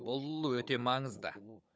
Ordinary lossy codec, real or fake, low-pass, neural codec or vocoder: none; fake; none; codec, 16 kHz, 16 kbps, FunCodec, trained on Chinese and English, 50 frames a second